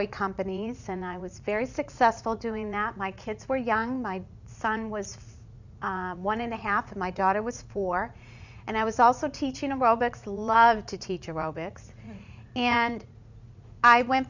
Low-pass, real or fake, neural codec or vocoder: 7.2 kHz; fake; vocoder, 44.1 kHz, 80 mel bands, Vocos